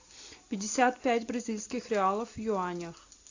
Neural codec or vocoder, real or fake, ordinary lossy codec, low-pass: none; real; AAC, 48 kbps; 7.2 kHz